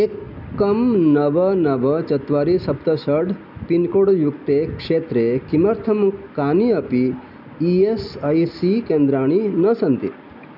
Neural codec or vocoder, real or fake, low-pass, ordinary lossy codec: none; real; 5.4 kHz; none